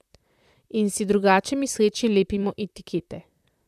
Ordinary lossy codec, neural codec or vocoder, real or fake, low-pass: none; vocoder, 44.1 kHz, 128 mel bands, Pupu-Vocoder; fake; 14.4 kHz